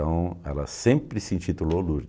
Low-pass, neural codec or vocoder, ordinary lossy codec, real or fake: none; none; none; real